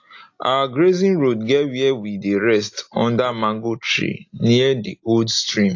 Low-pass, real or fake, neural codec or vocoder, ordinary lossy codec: 7.2 kHz; real; none; AAC, 48 kbps